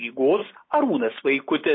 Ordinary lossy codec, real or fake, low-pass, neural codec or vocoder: MP3, 24 kbps; real; 7.2 kHz; none